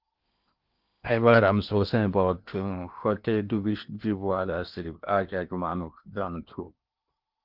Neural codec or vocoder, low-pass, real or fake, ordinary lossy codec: codec, 16 kHz in and 24 kHz out, 0.8 kbps, FocalCodec, streaming, 65536 codes; 5.4 kHz; fake; Opus, 24 kbps